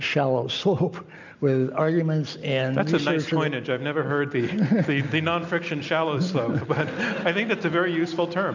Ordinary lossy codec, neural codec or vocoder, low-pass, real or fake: MP3, 64 kbps; none; 7.2 kHz; real